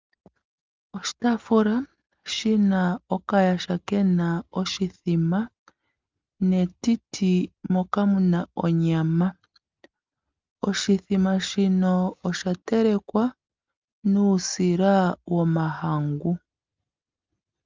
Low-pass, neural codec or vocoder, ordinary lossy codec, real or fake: 7.2 kHz; none; Opus, 24 kbps; real